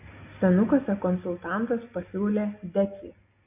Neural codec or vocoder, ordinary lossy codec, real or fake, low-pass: none; MP3, 24 kbps; real; 3.6 kHz